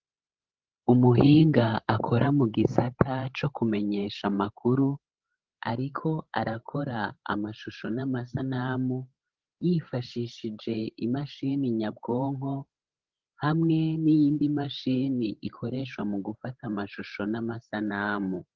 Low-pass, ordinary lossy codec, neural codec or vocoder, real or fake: 7.2 kHz; Opus, 16 kbps; codec, 16 kHz, 16 kbps, FreqCodec, larger model; fake